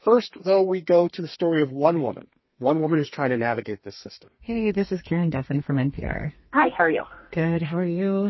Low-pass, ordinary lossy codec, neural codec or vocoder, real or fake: 7.2 kHz; MP3, 24 kbps; codec, 32 kHz, 1.9 kbps, SNAC; fake